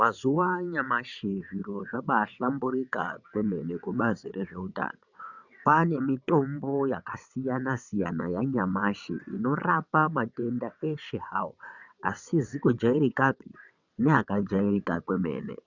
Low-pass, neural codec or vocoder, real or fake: 7.2 kHz; vocoder, 22.05 kHz, 80 mel bands, WaveNeXt; fake